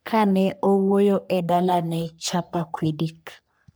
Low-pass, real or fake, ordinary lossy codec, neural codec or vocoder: none; fake; none; codec, 44.1 kHz, 3.4 kbps, Pupu-Codec